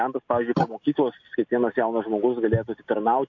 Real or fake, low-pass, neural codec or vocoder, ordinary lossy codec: real; 7.2 kHz; none; MP3, 48 kbps